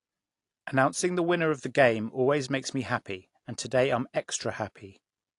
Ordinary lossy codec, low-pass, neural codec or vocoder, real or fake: AAC, 48 kbps; 10.8 kHz; none; real